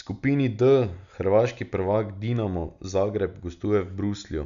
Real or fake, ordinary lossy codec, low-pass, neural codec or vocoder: real; none; 7.2 kHz; none